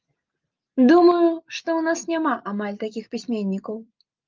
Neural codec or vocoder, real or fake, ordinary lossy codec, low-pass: none; real; Opus, 24 kbps; 7.2 kHz